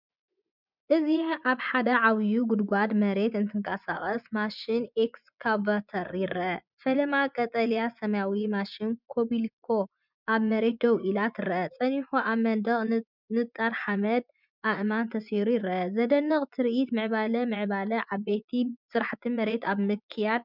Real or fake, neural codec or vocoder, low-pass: fake; vocoder, 24 kHz, 100 mel bands, Vocos; 5.4 kHz